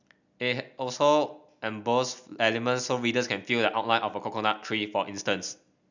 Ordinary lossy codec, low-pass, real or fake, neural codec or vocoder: none; 7.2 kHz; real; none